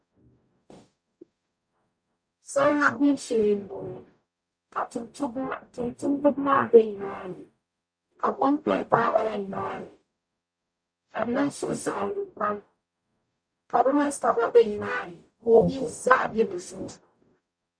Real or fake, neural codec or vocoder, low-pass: fake; codec, 44.1 kHz, 0.9 kbps, DAC; 9.9 kHz